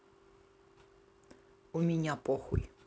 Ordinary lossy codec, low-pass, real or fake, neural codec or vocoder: none; none; real; none